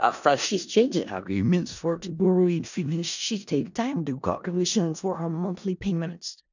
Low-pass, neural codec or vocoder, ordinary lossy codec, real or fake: 7.2 kHz; codec, 16 kHz in and 24 kHz out, 0.4 kbps, LongCat-Audio-Codec, four codebook decoder; none; fake